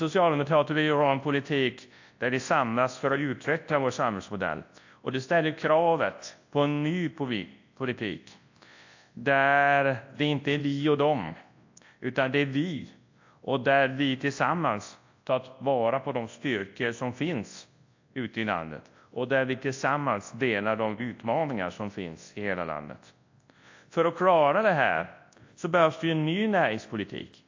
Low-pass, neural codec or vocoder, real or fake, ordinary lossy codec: 7.2 kHz; codec, 24 kHz, 0.9 kbps, WavTokenizer, large speech release; fake; AAC, 48 kbps